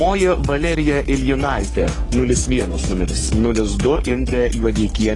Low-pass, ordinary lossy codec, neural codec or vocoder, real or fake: 10.8 kHz; AAC, 32 kbps; codec, 44.1 kHz, 3.4 kbps, Pupu-Codec; fake